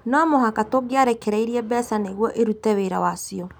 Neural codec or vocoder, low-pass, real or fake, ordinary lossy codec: none; none; real; none